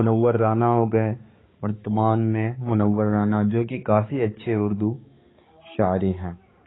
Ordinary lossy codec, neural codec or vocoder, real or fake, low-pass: AAC, 16 kbps; codec, 16 kHz, 4 kbps, X-Codec, HuBERT features, trained on balanced general audio; fake; 7.2 kHz